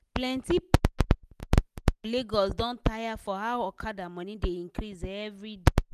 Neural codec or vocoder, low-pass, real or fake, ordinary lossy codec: none; 14.4 kHz; real; none